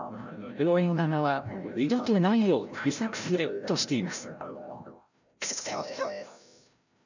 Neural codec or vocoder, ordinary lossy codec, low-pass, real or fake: codec, 16 kHz, 0.5 kbps, FreqCodec, larger model; none; 7.2 kHz; fake